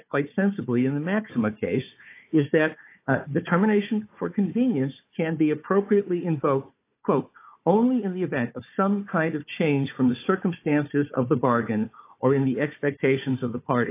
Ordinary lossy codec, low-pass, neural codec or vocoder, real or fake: AAC, 24 kbps; 3.6 kHz; codec, 16 kHz, 4 kbps, FunCodec, trained on Chinese and English, 50 frames a second; fake